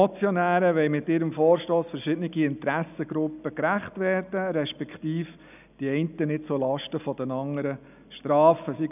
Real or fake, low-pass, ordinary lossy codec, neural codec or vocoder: real; 3.6 kHz; none; none